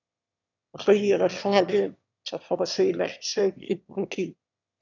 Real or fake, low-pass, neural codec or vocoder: fake; 7.2 kHz; autoencoder, 22.05 kHz, a latent of 192 numbers a frame, VITS, trained on one speaker